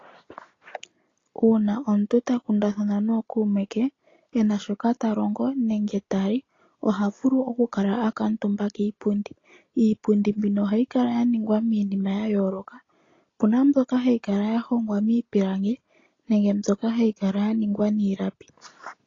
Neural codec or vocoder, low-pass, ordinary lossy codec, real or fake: none; 7.2 kHz; AAC, 32 kbps; real